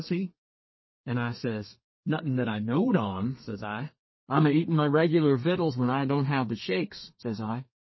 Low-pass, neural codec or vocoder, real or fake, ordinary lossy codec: 7.2 kHz; codec, 32 kHz, 1.9 kbps, SNAC; fake; MP3, 24 kbps